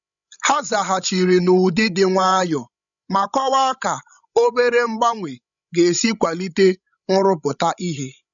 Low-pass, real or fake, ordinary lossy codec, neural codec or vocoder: 7.2 kHz; fake; none; codec, 16 kHz, 16 kbps, FreqCodec, larger model